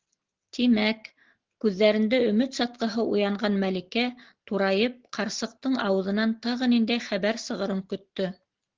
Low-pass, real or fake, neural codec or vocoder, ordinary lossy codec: 7.2 kHz; real; none; Opus, 16 kbps